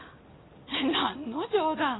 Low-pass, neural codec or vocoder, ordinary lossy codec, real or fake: 7.2 kHz; none; AAC, 16 kbps; real